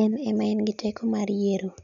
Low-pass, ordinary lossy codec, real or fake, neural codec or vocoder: 7.2 kHz; none; real; none